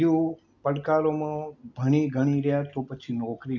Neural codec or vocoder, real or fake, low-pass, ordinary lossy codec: none; real; 7.2 kHz; none